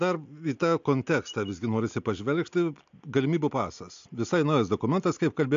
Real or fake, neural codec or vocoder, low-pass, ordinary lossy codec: real; none; 7.2 kHz; AAC, 64 kbps